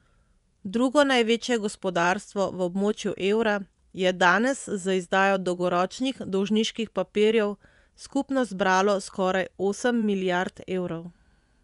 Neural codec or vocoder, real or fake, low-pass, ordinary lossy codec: vocoder, 24 kHz, 100 mel bands, Vocos; fake; 10.8 kHz; none